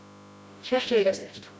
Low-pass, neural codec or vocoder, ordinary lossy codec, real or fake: none; codec, 16 kHz, 0.5 kbps, FreqCodec, smaller model; none; fake